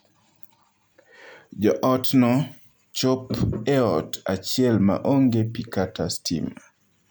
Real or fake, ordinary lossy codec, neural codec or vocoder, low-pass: real; none; none; none